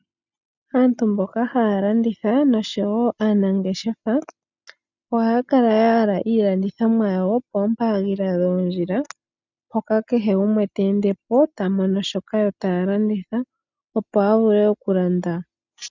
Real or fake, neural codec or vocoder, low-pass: real; none; 7.2 kHz